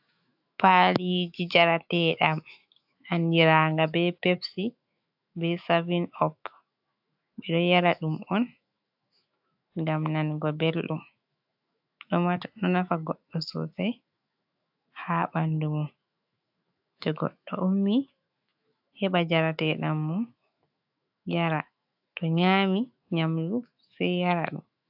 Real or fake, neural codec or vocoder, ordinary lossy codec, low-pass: fake; autoencoder, 48 kHz, 128 numbers a frame, DAC-VAE, trained on Japanese speech; AAC, 48 kbps; 5.4 kHz